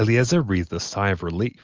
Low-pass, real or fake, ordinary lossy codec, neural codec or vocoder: 7.2 kHz; real; Opus, 24 kbps; none